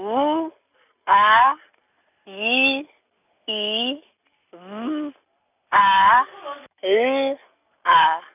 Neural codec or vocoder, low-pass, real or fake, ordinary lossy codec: none; 3.6 kHz; real; none